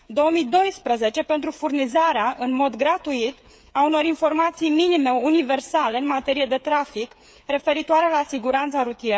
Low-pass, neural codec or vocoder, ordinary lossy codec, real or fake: none; codec, 16 kHz, 8 kbps, FreqCodec, smaller model; none; fake